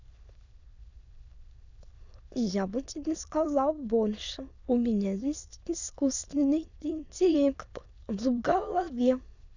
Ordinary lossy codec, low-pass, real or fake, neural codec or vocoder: none; 7.2 kHz; fake; autoencoder, 22.05 kHz, a latent of 192 numbers a frame, VITS, trained on many speakers